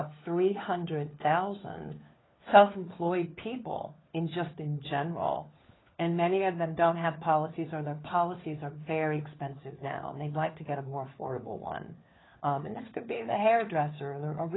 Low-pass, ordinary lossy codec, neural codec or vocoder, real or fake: 7.2 kHz; AAC, 16 kbps; codec, 16 kHz, 4 kbps, FunCodec, trained on LibriTTS, 50 frames a second; fake